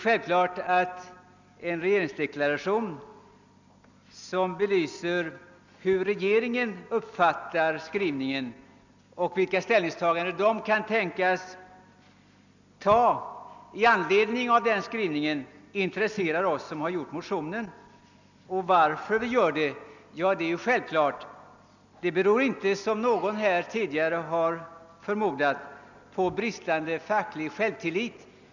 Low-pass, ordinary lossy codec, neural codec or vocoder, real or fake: 7.2 kHz; none; none; real